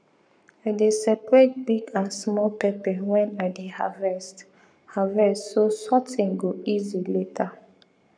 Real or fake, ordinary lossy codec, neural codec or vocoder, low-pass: fake; none; codec, 44.1 kHz, 7.8 kbps, Pupu-Codec; 9.9 kHz